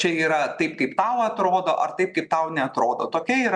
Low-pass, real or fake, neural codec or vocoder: 9.9 kHz; real; none